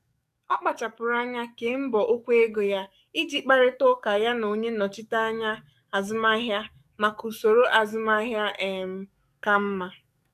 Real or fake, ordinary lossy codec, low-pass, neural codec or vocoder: fake; none; 14.4 kHz; codec, 44.1 kHz, 7.8 kbps, DAC